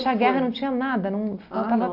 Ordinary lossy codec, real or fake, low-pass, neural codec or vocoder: none; real; 5.4 kHz; none